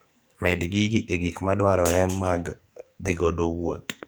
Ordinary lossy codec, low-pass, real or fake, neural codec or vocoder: none; none; fake; codec, 44.1 kHz, 2.6 kbps, SNAC